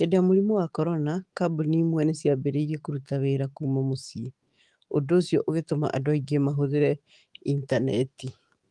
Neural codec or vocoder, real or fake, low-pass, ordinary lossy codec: autoencoder, 48 kHz, 128 numbers a frame, DAC-VAE, trained on Japanese speech; fake; 10.8 kHz; Opus, 24 kbps